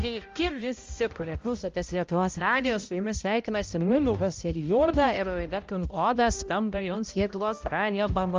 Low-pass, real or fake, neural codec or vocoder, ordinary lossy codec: 7.2 kHz; fake; codec, 16 kHz, 0.5 kbps, X-Codec, HuBERT features, trained on balanced general audio; Opus, 32 kbps